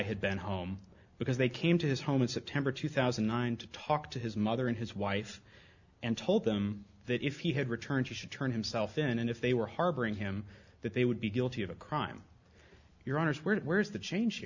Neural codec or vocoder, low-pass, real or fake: none; 7.2 kHz; real